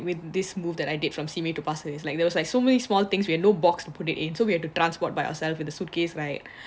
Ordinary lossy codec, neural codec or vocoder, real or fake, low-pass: none; none; real; none